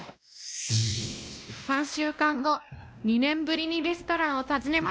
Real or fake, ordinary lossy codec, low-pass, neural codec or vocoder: fake; none; none; codec, 16 kHz, 1 kbps, X-Codec, WavLM features, trained on Multilingual LibriSpeech